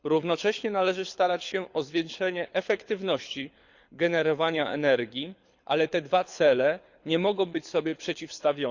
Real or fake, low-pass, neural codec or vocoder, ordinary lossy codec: fake; 7.2 kHz; codec, 24 kHz, 6 kbps, HILCodec; Opus, 64 kbps